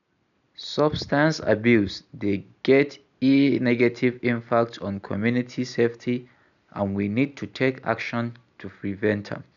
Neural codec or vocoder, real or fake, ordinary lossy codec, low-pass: none; real; none; 7.2 kHz